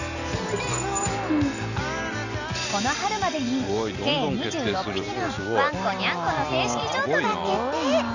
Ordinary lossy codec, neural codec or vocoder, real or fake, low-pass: none; none; real; 7.2 kHz